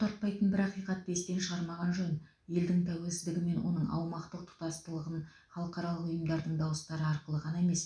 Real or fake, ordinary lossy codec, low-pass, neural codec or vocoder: real; none; 9.9 kHz; none